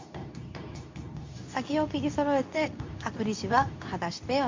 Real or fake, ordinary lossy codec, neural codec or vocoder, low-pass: fake; MP3, 64 kbps; codec, 24 kHz, 0.9 kbps, WavTokenizer, medium speech release version 2; 7.2 kHz